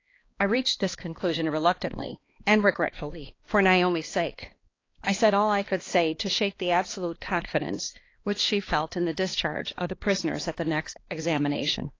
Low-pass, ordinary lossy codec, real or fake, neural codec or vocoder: 7.2 kHz; AAC, 32 kbps; fake; codec, 16 kHz, 2 kbps, X-Codec, HuBERT features, trained on balanced general audio